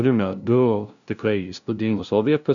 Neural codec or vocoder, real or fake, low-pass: codec, 16 kHz, 0.5 kbps, FunCodec, trained on LibriTTS, 25 frames a second; fake; 7.2 kHz